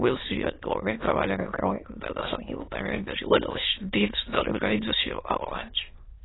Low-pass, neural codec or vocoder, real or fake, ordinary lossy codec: 7.2 kHz; autoencoder, 22.05 kHz, a latent of 192 numbers a frame, VITS, trained on many speakers; fake; AAC, 16 kbps